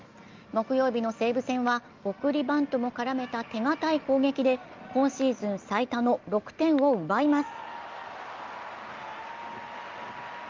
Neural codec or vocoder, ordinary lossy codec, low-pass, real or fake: none; Opus, 24 kbps; 7.2 kHz; real